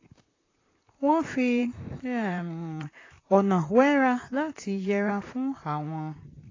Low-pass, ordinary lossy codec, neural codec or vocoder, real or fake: 7.2 kHz; AAC, 32 kbps; vocoder, 44.1 kHz, 128 mel bands, Pupu-Vocoder; fake